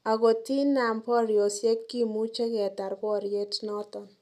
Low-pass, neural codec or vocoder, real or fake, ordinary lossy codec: 14.4 kHz; none; real; none